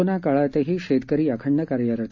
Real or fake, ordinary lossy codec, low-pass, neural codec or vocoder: real; MP3, 64 kbps; 7.2 kHz; none